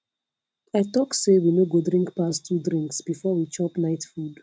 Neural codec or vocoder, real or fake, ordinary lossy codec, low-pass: none; real; none; none